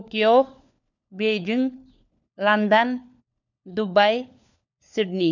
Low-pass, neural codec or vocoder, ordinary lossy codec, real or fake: 7.2 kHz; codec, 16 kHz, 4 kbps, FunCodec, trained on LibriTTS, 50 frames a second; none; fake